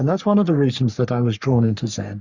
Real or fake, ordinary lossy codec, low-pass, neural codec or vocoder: fake; Opus, 64 kbps; 7.2 kHz; codec, 44.1 kHz, 3.4 kbps, Pupu-Codec